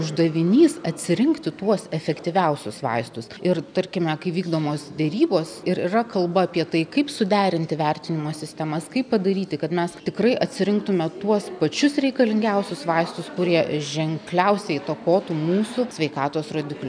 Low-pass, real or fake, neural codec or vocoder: 9.9 kHz; real; none